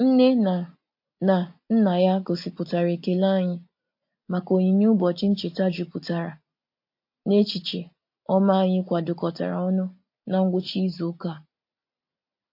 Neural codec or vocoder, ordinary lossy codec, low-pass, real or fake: none; MP3, 32 kbps; 5.4 kHz; real